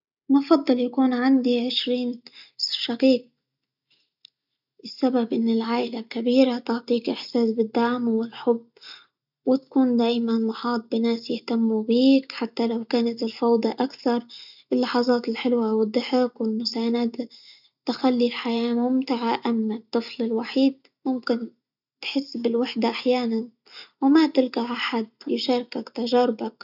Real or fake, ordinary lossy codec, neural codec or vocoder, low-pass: real; none; none; 5.4 kHz